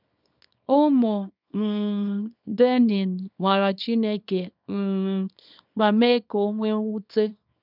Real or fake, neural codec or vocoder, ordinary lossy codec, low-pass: fake; codec, 24 kHz, 0.9 kbps, WavTokenizer, small release; none; 5.4 kHz